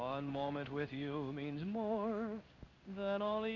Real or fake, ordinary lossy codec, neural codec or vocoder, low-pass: fake; AAC, 48 kbps; codec, 16 kHz in and 24 kHz out, 1 kbps, XY-Tokenizer; 7.2 kHz